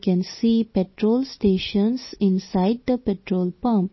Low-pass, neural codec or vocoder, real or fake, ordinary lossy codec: 7.2 kHz; none; real; MP3, 24 kbps